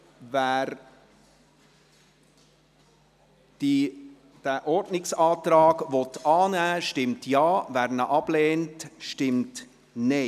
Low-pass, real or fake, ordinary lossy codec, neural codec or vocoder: 14.4 kHz; real; none; none